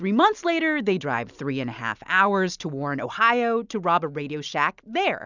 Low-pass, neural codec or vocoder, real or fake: 7.2 kHz; none; real